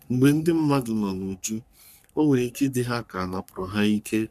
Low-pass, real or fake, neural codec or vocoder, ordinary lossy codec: 14.4 kHz; fake; codec, 44.1 kHz, 3.4 kbps, Pupu-Codec; none